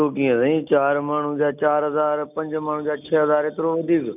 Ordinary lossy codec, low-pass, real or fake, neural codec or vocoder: none; 3.6 kHz; real; none